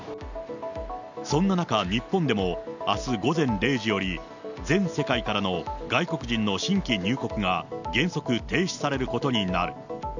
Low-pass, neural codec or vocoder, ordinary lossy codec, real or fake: 7.2 kHz; none; none; real